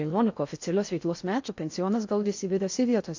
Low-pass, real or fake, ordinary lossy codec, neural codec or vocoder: 7.2 kHz; fake; AAC, 48 kbps; codec, 16 kHz in and 24 kHz out, 0.6 kbps, FocalCodec, streaming, 4096 codes